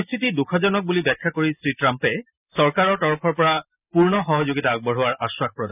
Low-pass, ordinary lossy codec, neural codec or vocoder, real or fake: 3.6 kHz; none; none; real